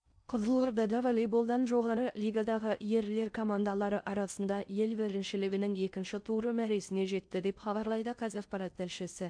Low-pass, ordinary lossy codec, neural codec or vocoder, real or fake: 9.9 kHz; AAC, 64 kbps; codec, 16 kHz in and 24 kHz out, 0.6 kbps, FocalCodec, streaming, 4096 codes; fake